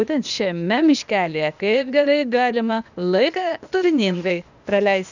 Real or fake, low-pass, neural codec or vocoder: fake; 7.2 kHz; codec, 16 kHz, 0.8 kbps, ZipCodec